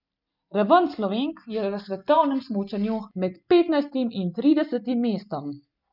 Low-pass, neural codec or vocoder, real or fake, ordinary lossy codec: 5.4 kHz; none; real; none